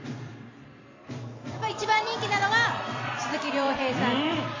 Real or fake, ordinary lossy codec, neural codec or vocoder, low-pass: fake; MP3, 48 kbps; vocoder, 44.1 kHz, 128 mel bands every 256 samples, BigVGAN v2; 7.2 kHz